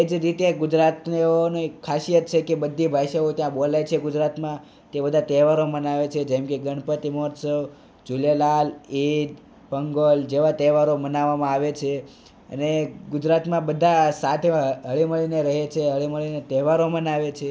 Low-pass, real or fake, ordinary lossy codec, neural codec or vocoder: none; real; none; none